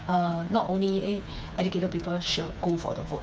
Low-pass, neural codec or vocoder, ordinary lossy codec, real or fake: none; codec, 16 kHz, 4 kbps, FreqCodec, smaller model; none; fake